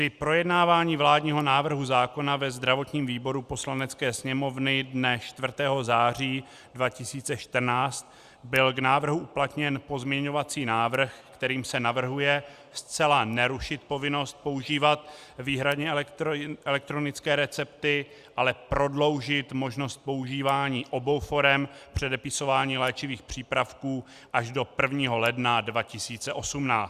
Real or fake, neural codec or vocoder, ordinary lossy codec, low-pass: real; none; Opus, 64 kbps; 14.4 kHz